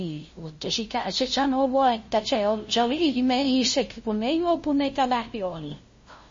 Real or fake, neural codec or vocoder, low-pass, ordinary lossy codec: fake; codec, 16 kHz, 0.5 kbps, FunCodec, trained on LibriTTS, 25 frames a second; 7.2 kHz; MP3, 32 kbps